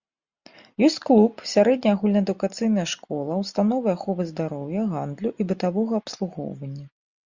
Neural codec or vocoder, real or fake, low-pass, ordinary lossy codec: none; real; 7.2 kHz; Opus, 64 kbps